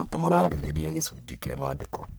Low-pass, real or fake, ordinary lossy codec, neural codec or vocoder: none; fake; none; codec, 44.1 kHz, 1.7 kbps, Pupu-Codec